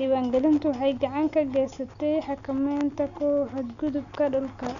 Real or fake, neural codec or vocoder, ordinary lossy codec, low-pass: real; none; none; 7.2 kHz